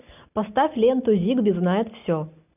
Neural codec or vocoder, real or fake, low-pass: none; real; 3.6 kHz